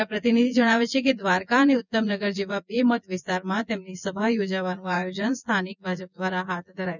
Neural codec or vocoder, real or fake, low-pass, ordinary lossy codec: vocoder, 24 kHz, 100 mel bands, Vocos; fake; 7.2 kHz; none